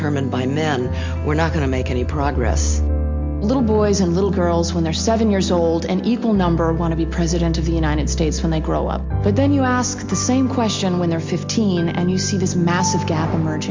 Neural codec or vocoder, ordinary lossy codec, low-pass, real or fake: none; MP3, 48 kbps; 7.2 kHz; real